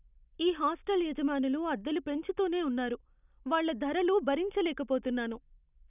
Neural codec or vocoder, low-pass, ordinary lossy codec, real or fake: none; 3.6 kHz; none; real